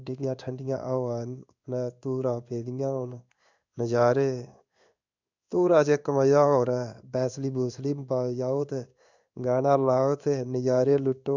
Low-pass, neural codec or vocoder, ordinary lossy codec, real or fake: 7.2 kHz; codec, 16 kHz in and 24 kHz out, 1 kbps, XY-Tokenizer; none; fake